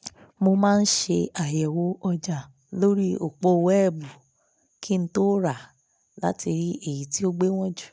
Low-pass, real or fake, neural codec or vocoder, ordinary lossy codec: none; real; none; none